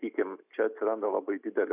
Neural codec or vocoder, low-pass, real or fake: none; 3.6 kHz; real